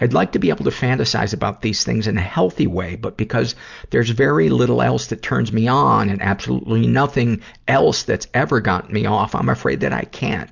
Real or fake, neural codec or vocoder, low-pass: real; none; 7.2 kHz